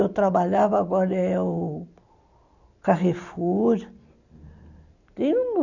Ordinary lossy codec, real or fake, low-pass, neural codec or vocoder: none; real; 7.2 kHz; none